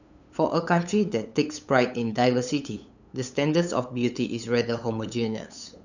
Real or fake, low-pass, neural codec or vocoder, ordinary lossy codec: fake; 7.2 kHz; codec, 16 kHz, 8 kbps, FunCodec, trained on LibriTTS, 25 frames a second; none